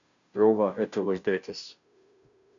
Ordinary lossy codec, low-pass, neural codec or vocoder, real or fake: MP3, 96 kbps; 7.2 kHz; codec, 16 kHz, 0.5 kbps, FunCodec, trained on Chinese and English, 25 frames a second; fake